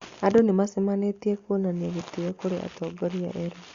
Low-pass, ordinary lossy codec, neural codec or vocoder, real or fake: 7.2 kHz; Opus, 64 kbps; none; real